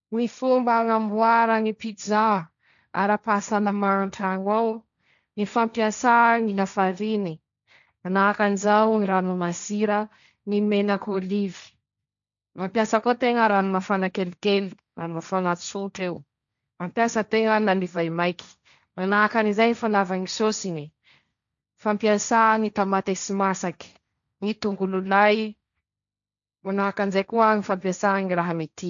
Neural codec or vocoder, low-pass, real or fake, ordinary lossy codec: codec, 16 kHz, 1.1 kbps, Voila-Tokenizer; 7.2 kHz; fake; none